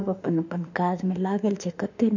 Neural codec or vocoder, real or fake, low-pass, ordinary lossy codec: autoencoder, 48 kHz, 32 numbers a frame, DAC-VAE, trained on Japanese speech; fake; 7.2 kHz; none